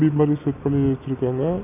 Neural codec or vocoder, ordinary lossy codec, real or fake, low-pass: none; none; real; 3.6 kHz